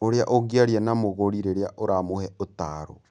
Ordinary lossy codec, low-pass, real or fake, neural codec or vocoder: none; 9.9 kHz; real; none